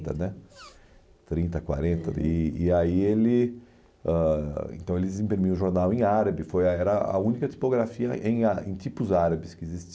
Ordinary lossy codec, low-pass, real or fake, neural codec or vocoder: none; none; real; none